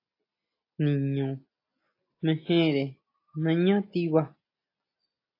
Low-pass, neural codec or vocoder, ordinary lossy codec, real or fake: 5.4 kHz; none; AAC, 32 kbps; real